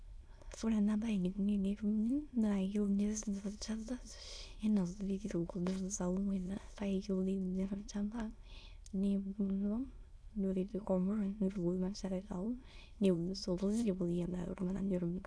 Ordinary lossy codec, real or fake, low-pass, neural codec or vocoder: none; fake; none; autoencoder, 22.05 kHz, a latent of 192 numbers a frame, VITS, trained on many speakers